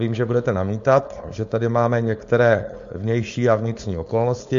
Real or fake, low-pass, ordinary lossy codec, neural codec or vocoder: fake; 7.2 kHz; MP3, 48 kbps; codec, 16 kHz, 4.8 kbps, FACodec